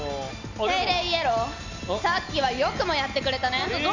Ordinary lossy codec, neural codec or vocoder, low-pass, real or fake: none; none; 7.2 kHz; real